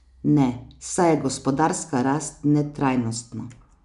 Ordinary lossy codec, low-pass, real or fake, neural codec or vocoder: none; 10.8 kHz; real; none